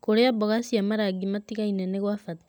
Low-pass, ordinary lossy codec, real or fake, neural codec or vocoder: none; none; real; none